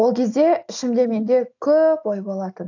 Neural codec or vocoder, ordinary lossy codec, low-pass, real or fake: none; AAC, 48 kbps; 7.2 kHz; real